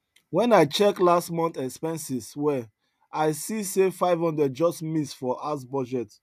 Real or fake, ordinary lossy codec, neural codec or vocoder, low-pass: real; none; none; 14.4 kHz